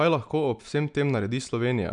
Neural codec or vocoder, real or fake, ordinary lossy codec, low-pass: none; real; none; 9.9 kHz